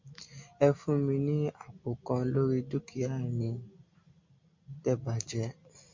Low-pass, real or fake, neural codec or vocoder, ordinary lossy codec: 7.2 kHz; real; none; MP3, 48 kbps